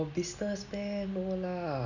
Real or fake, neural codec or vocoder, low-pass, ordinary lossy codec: real; none; 7.2 kHz; none